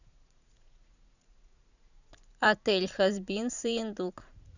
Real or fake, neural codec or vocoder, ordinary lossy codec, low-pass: fake; vocoder, 44.1 kHz, 80 mel bands, Vocos; none; 7.2 kHz